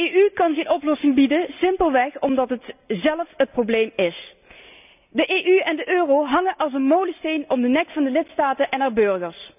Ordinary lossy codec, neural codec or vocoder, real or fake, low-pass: none; none; real; 3.6 kHz